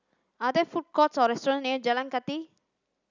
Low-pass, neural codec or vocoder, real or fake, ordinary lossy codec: 7.2 kHz; none; real; none